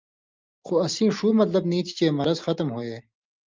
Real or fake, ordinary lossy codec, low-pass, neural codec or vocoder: real; Opus, 24 kbps; 7.2 kHz; none